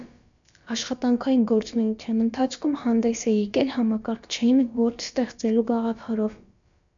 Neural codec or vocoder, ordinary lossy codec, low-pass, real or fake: codec, 16 kHz, about 1 kbps, DyCAST, with the encoder's durations; MP3, 64 kbps; 7.2 kHz; fake